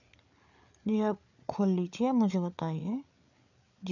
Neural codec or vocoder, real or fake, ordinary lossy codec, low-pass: codec, 16 kHz, 8 kbps, FreqCodec, larger model; fake; none; 7.2 kHz